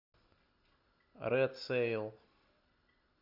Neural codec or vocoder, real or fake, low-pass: none; real; 5.4 kHz